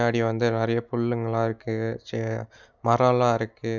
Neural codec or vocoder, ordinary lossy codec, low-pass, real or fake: none; none; 7.2 kHz; real